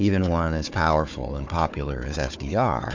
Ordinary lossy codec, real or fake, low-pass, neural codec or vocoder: MP3, 64 kbps; fake; 7.2 kHz; codec, 16 kHz, 4 kbps, FunCodec, trained on Chinese and English, 50 frames a second